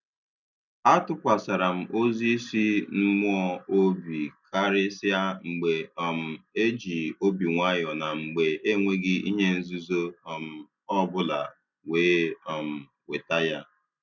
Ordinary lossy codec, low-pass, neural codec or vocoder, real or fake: none; 7.2 kHz; none; real